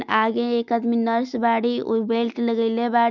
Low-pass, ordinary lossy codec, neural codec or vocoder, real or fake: 7.2 kHz; none; none; real